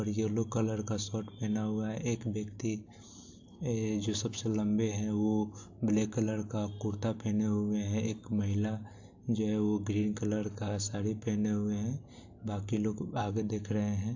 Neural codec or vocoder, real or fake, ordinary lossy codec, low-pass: none; real; AAC, 48 kbps; 7.2 kHz